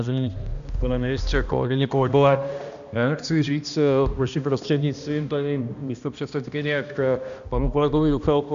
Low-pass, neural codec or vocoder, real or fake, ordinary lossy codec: 7.2 kHz; codec, 16 kHz, 1 kbps, X-Codec, HuBERT features, trained on balanced general audio; fake; AAC, 96 kbps